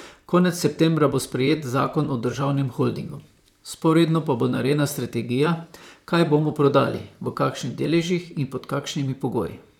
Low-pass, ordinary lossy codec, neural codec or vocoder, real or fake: 19.8 kHz; none; vocoder, 44.1 kHz, 128 mel bands, Pupu-Vocoder; fake